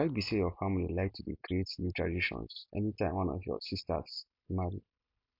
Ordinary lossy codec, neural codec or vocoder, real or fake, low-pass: MP3, 48 kbps; none; real; 5.4 kHz